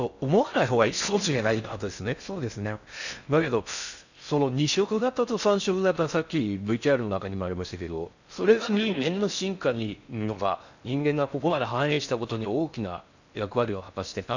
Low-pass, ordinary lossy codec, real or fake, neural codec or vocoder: 7.2 kHz; none; fake; codec, 16 kHz in and 24 kHz out, 0.6 kbps, FocalCodec, streaming, 4096 codes